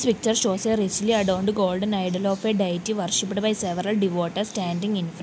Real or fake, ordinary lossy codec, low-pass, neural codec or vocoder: real; none; none; none